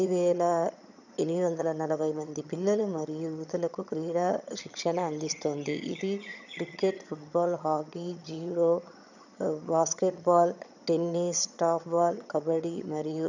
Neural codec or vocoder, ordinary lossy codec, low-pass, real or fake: vocoder, 22.05 kHz, 80 mel bands, HiFi-GAN; none; 7.2 kHz; fake